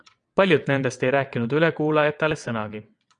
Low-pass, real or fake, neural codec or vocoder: 9.9 kHz; fake; vocoder, 22.05 kHz, 80 mel bands, WaveNeXt